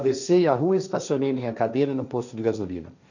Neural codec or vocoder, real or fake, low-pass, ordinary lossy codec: codec, 16 kHz, 1.1 kbps, Voila-Tokenizer; fake; 7.2 kHz; none